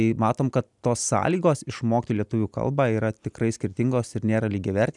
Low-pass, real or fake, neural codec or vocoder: 10.8 kHz; real; none